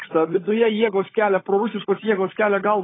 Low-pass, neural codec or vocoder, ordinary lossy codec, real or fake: 7.2 kHz; codec, 16 kHz, 16 kbps, FunCodec, trained on LibriTTS, 50 frames a second; AAC, 16 kbps; fake